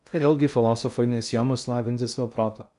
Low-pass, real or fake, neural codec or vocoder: 10.8 kHz; fake; codec, 16 kHz in and 24 kHz out, 0.6 kbps, FocalCodec, streaming, 4096 codes